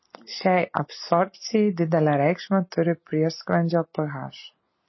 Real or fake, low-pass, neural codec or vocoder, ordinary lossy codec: real; 7.2 kHz; none; MP3, 24 kbps